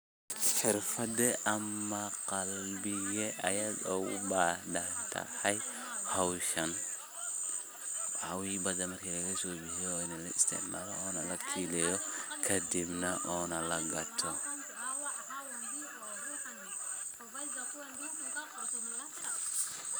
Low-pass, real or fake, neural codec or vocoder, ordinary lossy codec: none; real; none; none